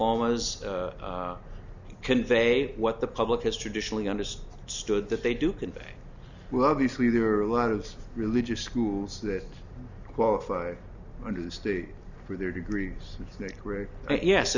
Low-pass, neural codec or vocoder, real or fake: 7.2 kHz; none; real